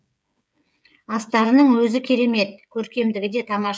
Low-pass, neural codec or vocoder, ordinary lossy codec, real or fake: none; codec, 16 kHz, 16 kbps, FreqCodec, smaller model; none; fake